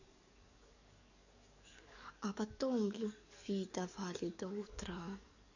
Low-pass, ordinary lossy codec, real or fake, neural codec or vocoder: 7.2 kHz; none; fake; codec, 44.1 kHz, 7.8 kbps, DAC